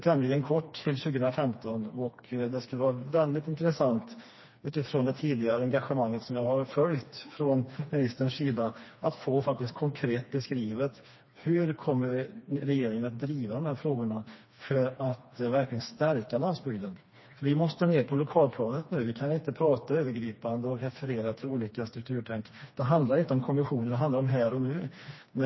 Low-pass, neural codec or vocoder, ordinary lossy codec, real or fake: 7.2 kHz; codec, 16 kHz, 2 kbps, FreqCodec, smaller model; MP3, 24 kbps; fake